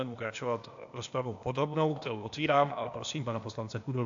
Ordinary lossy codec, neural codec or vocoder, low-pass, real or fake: AAC, 64 kbps; codec, 16 kHz, 0.8 kbps, ZipCodec; 7.2 kHz; fake